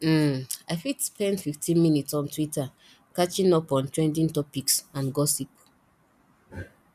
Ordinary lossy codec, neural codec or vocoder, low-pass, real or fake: none; none; 14.4 kHz; real